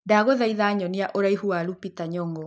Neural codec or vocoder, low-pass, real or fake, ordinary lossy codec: none; none; real; none